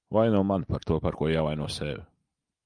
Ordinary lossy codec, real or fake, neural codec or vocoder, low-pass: Opus, 32 kbps; real; none; 9.9 kHz